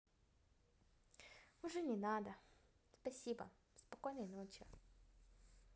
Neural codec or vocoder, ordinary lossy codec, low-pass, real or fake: none; none; none; real